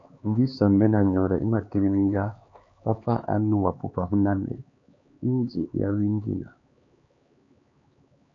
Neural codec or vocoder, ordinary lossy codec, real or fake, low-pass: codec, 16 kHz, 4 kbps, X-Codec, HuBERT features, trained on LibriSpeech; AAC, 48 kbps; fake; 7.2 kHz